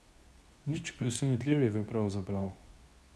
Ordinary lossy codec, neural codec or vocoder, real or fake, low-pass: none; codec, 24 kHz, 0.9 kbps, WavTokenizer, medium speech release version 2; fake; none